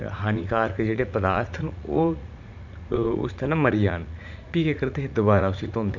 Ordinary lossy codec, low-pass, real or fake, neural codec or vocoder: none; 7.2 kHz; fake; vocoder, 44.1 kHz, 80 mel bands, Vocos